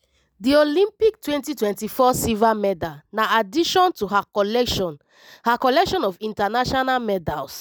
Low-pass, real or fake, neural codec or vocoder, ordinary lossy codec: none; real; none; none